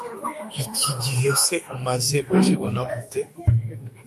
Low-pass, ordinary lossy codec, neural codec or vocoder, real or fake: 14.4 kHz; MP3, 64 kbps; autoencoder, 48 kHz, 32 numbers a frame, DAC-VAE, trained on Japanese speech; fake